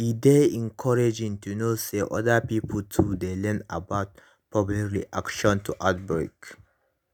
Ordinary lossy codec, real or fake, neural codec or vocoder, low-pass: none; real; none; none